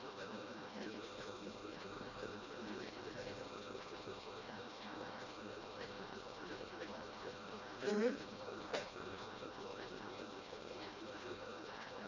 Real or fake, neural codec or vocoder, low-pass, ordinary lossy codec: fake; codec, 16 kHz, 1 kbps, FreqCodec, smaller model; 7.2 kHz; none